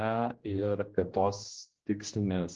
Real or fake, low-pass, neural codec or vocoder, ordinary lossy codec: fake; 7.2 kHz; codec, 16 kHz, 1 kbps, X-Codec, HuBERT features, trained on general audio; Opus, 16 kbps